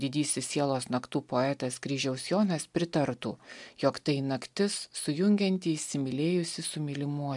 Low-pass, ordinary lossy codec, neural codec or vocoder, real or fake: 10.8 kHz; MP3, 96 kbps; none; real